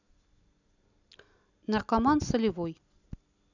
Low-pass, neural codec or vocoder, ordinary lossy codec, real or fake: 7.2 kHz; none; none; real